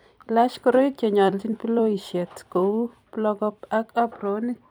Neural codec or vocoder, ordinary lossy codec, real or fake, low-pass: vocoder, 44.1 kHz, 128 mel bands every 256 samples, BigVGAN v2; none; fake; none